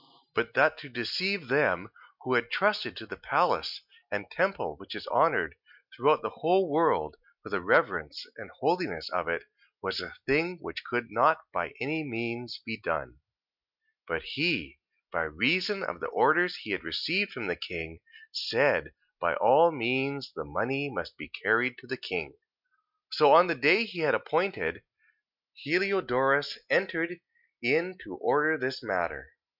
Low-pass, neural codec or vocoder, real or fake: 5.4 kHz; none; real